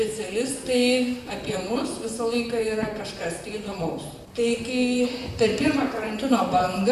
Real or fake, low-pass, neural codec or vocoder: fake; 14.4 kHz; vocoder, 44.1 kHz, 128 mel bands, Pupu-Vocoder